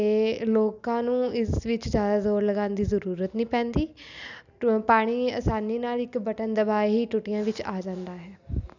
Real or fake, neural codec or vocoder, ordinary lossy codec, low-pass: real; none; none; 7.2 kHz